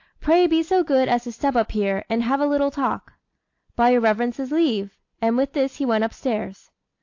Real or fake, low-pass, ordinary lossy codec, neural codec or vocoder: real; 7.2 kHz; AAC, 48 kbps; none